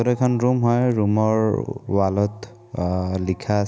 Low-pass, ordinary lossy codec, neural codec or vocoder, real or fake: none; none; none; real